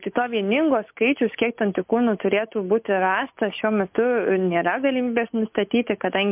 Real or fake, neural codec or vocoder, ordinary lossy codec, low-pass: real; none; MP3, 32 kbps; 3.6 kHz